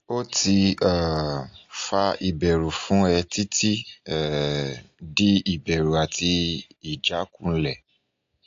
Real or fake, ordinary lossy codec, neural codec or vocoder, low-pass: real; AAC, 48 kbps; none; 7.2 kHz